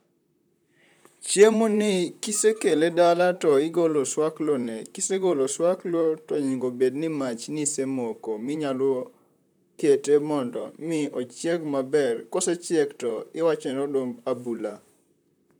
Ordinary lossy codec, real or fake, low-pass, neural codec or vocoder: none; fake; none; vocoder, 44.1 kHz, 128 mel bands, Pupu-Vocoder